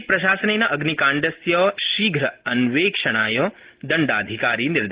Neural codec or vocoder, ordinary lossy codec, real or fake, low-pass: none; Opus, 16 kbps; real; 3.6 kHz